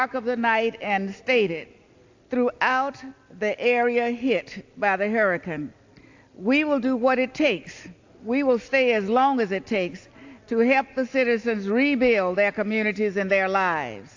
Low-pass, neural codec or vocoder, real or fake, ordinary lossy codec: 7.2 kHz; none; real; AAC, 48 kbps